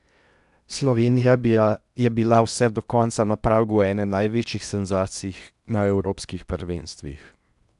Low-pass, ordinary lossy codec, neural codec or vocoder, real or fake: 10.8 kHz; none; codec, 16 kHz in and 24 kHz out, 0.8 kbps, FocalCodec, streaming, 65536 codes; fake